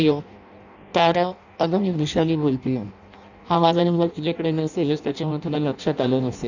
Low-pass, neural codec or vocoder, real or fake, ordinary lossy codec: 7.2 kHz; codec, 16 kHz in and 24 kHz out, 0.6 kbps, FireRedTTS-2 codec; fake; none